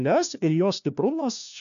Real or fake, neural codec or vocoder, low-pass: fake; codec, 16 kHz, 1 kbps, FunCodec, trained on LibriTTS, 50 frames a second; 7.2 kHz